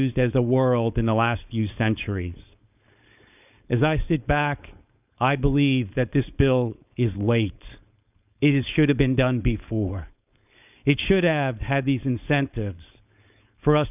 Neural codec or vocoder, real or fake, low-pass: codec, 16 kHz, 4.8 kbps, FACodec; fake; 3.6 kHz